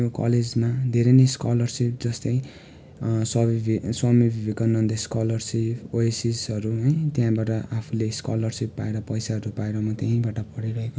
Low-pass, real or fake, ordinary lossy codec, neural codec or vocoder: none; real; none; none